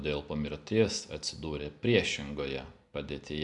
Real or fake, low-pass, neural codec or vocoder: real; 10.8 kHz; none